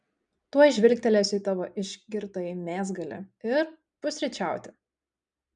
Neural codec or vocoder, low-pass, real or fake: none; 9.9 kHz; real